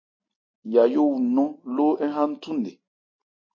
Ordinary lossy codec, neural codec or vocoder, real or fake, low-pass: MP3, 32 kbps; none; real; 7.2 kHz